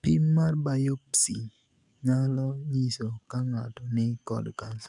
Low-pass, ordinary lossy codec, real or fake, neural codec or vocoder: 10.8 kHz; MP3, 96 kbps; fake; codec, 44.1 kHz, 7.8 kbps, DAC